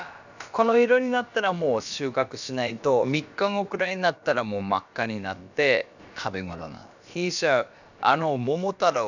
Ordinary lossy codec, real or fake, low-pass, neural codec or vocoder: none; fake; 7.2 kHz; codec, 16 kHz, about 1 kbps, DyCAST, with the encoder's durations